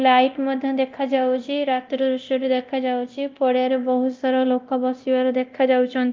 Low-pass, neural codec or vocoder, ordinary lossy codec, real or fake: 7.2 kHz; codec, 24 kHz, 0.9 kbps, DualCodec; Opus, 24 kbps; fake